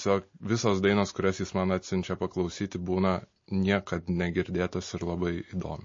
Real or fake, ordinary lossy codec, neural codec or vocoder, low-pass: real; MP3, 32 kbps; none; 7.2 kHz